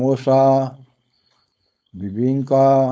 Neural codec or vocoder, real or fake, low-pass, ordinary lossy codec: codec, 16 kHz, 4.8 kbps, FACodec; fake; none; none